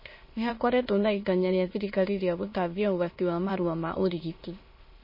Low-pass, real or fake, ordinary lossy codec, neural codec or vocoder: 5.4 kHz; fake; MP3, 24 kbps; autoencoder, 22.05 kHz, a latent of 192 numbers a frame, VITS, trained on many speakers